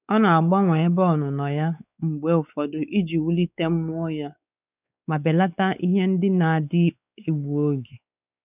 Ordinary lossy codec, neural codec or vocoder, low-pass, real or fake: none; codec, 16 kHz, 4 kbps, X-Codec, WavLM features, trained on Multilingual LibriSpeech; 3.6 kHz; fake